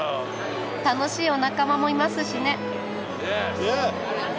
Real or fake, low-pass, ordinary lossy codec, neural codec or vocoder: real; none; none; none